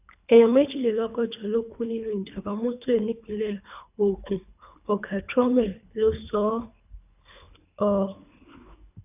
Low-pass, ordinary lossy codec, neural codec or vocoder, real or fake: 3.6 kHz; none; codec, 24 kHz, 3 kbps, HILCodec; fake